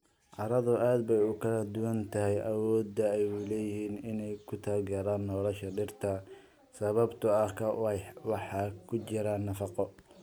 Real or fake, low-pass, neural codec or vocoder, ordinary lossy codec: real; none; none; none